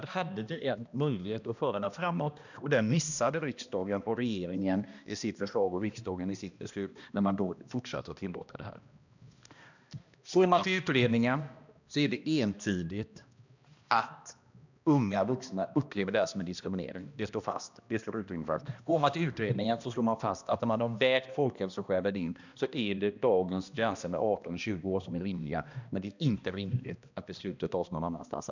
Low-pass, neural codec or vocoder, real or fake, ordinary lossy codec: 7.2 kHz; codec, 16 kHz, 1 kbps, X-Codec, HuBERT features, trained on balanced general audio; fake; none